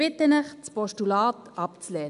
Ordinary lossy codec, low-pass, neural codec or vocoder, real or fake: none; 10.8 kHz; none; real